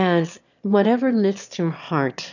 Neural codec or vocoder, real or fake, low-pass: autoencoder, 22.05 kHz, a latent of 192 numbers a frame, VITS, trained on one speaker; fake; 7.2 kHz